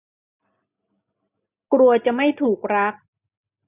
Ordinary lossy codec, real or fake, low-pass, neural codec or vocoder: none; real; 3.6 kHz; none